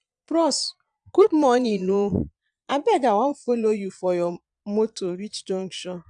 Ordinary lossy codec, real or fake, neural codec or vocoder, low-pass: none; fake; vocoder, 22.05 kHz, 80 mel bands, Vocos; 9.9 kHz